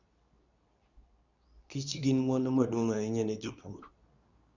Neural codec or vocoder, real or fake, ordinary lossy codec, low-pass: codec, 24 kHz, 0.9 kbps, WavTokenizer, medium speech release version 2; fake; none; 7.2 kHz